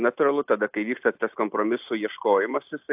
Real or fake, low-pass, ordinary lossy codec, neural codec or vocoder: real; 3.6 kHz; AAC, 32 kbps; none